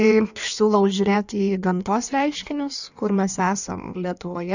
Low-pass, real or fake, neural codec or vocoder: 7.2 kHz; fake; codec, 16 kHz in and 24 kHz out, 1.1 kbps, FireRedTTS-2 codec